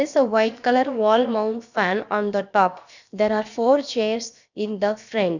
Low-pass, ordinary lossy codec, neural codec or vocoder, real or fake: 7.2 kHz; none; codec, 16 kHz, about 1 kbps, DyCAST, with the encoder's durations; fake